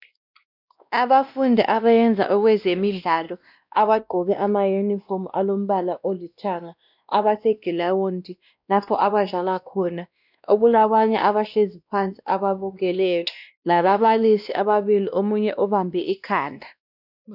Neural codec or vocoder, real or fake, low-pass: codec, 16 kHz, 1 kbps, X-Codec, WavLM features, trained on Multilingual LibriSpeech; fake; 5.4 kHz